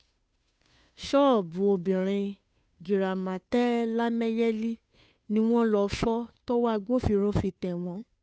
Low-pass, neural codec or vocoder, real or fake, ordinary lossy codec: none; codec, 16 kHz, 2 kbps, FunCodec, trained on Chinese and English, 25 frames a second; fake; none